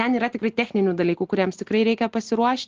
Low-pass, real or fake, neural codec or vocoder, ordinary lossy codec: 7.2 kHz; real; none; Opus, 16 kbps